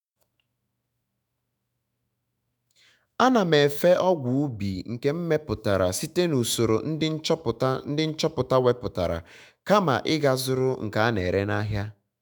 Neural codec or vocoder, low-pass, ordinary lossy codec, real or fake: autoencoder, 48 kHz, 128 numbers a frame, DAC-VAE, trained on Japanese speech; none; none; fake